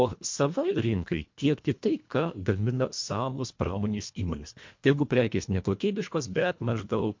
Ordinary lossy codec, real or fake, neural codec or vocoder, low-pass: MP3, 48 kbps; fake; codec, 24 kHz, 1.5 kbps, HILCodec; 7.2 kHz